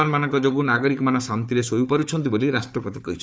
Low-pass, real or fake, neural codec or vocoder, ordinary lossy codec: none; fake; codec, 16 kHz, 4 kbps, FunCodec, trained on Chinese and English, 50 frames a second; none